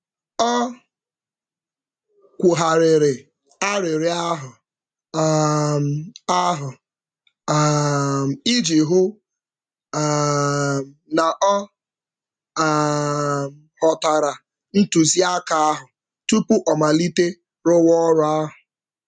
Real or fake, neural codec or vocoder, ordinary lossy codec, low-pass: real; none; none; 9.9 kHz